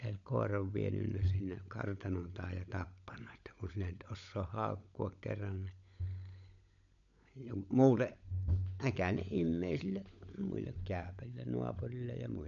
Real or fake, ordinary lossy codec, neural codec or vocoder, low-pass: fake; none; codec, 16 kHz, 16 kbps, FunCodec, trained on LibriTTS, 50 frames a second; 7.2 kHz